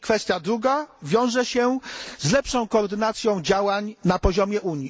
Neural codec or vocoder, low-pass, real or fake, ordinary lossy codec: none; none; real; none